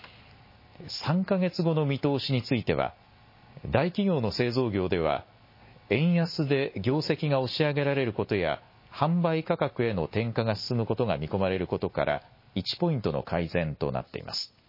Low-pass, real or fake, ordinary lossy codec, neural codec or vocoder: 5.4 kHz; real; MP3, 24 kbps; none